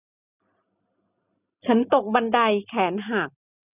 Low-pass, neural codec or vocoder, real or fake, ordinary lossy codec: 3.6 kHz; none; real; none